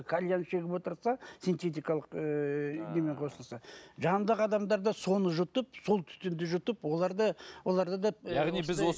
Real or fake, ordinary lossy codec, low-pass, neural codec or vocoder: real; none; none; none